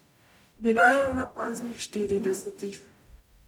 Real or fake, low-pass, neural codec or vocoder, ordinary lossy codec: fake; 19.8 kHz; codec, 44.1 kHz, 0.9 kbps, DAC; none